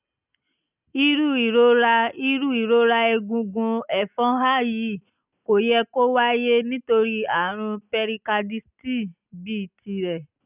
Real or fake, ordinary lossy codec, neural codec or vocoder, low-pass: real; none; none; 3.6 kHz